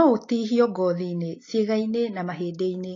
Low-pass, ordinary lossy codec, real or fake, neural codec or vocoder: 7.2 kHz; AAC, 32 kbps; real; none